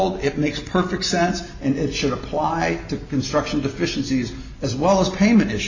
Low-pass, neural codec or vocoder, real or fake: 7.2 kHz; none; real